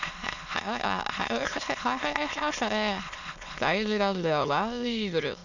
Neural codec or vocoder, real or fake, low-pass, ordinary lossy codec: autoencoder, 22.05 kHz, a latent of 192 numbers a frame, VITS, trained on many speakers; fake; 7.2 kHz; none